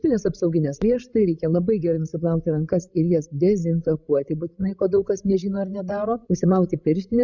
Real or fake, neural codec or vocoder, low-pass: fake; codec, 16 kHz, 16 kbps, FreqCodec, larger model; 7.2 kHz